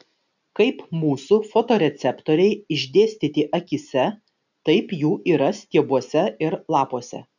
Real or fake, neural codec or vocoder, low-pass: real; none; 7.2 kHz